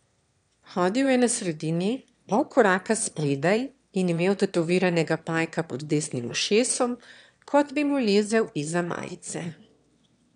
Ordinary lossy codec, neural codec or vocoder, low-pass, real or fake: none; autoencoder, 22.05 kHz, a latent of 192 numbers a frame, VITS, trained on one speaker; 9.9 kHz; fake